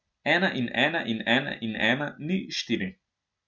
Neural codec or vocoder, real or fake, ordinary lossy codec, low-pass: none; real; none; none